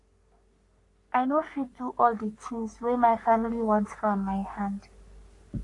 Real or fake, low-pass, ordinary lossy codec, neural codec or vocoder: fake; 10.8 kHz; AAC, 48 kbps; codec, 44.1 kHz, 3.4 kbps, Pupu-Codec